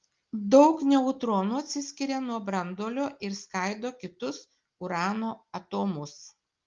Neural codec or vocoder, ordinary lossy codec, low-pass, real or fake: none; Opus, 32 kbps; 7.2 kHz; real